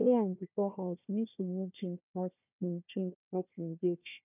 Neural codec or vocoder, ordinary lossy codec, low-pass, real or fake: codec, 16 kHz, 1 kbps, FunCodec, trained on Chinese and English, 50 frames a second; none; 3.6 kHz; fake